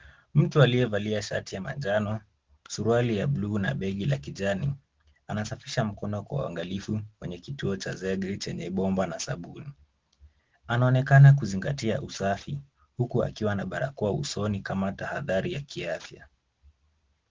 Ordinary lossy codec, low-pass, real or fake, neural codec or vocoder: Opus, 16 kbps; 7.2 kHz; real; none